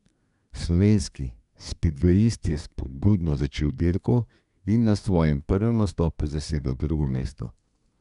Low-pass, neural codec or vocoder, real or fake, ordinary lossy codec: 10.8 kHz; codec, 24 kHz, 1 kbps, SNAC; fake; none